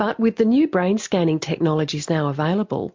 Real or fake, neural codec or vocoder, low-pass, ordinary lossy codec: real; none; 7.2 kHz; MP3, 48 kbps